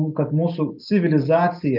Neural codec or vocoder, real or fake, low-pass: none; real; 5.4 kHz